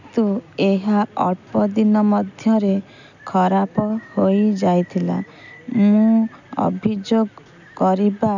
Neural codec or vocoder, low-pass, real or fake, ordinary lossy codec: none; 7.2 kHz; real; none